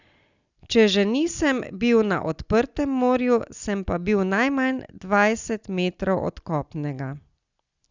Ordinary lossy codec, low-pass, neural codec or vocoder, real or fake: Opus, 64 kbps; 7.2 kHz; none; real